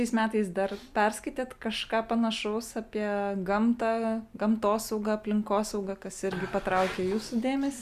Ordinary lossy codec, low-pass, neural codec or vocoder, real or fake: Opus, 64 kbps; 14.4 kHz; none; real